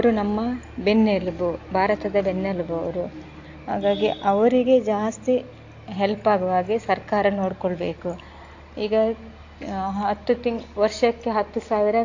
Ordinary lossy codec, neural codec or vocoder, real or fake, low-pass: AAC, 48 kbps; none; real; 7.2 kHz